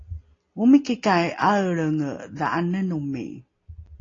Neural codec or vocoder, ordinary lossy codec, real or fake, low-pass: none; AAC, 32 kbps; real; 7.2 kHz